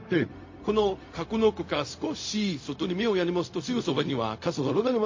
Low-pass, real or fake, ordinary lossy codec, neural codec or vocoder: 7.2 kHz; fake; MP3, 32 kbps; codec, 16 kHz, 0.4 kbps, LongCat-Audio-Codec